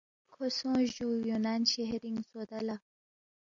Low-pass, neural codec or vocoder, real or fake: 7.2 kHz; none; real